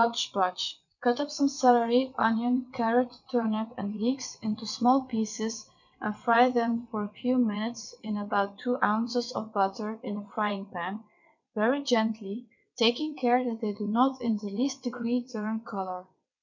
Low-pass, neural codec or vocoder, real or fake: 7.2 kHz; vocoder, 22.05 kHz, 80 mel bands, WaveNeXt; fake